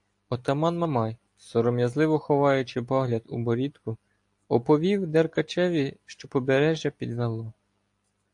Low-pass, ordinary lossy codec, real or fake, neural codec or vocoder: 10.8 kHz; AAC, 64 kbps; real; none